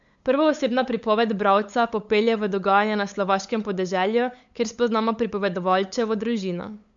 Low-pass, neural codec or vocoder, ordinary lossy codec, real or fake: 7.2 kHz; codec, 16 kHz, 8 kbps, FunCodec, trained on LibriTTS, 25 frames a second; MP3, 64 kbps; fake